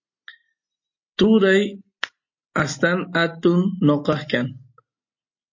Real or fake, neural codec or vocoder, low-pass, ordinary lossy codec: real; none; 7.2 kHz; MP3, 32 kbps